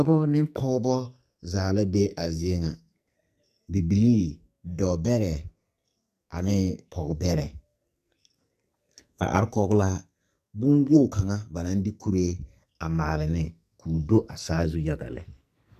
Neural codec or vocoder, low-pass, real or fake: codec, 44.1 kHz, 2.6 kbps, SNAC; 14.4 kHz; fake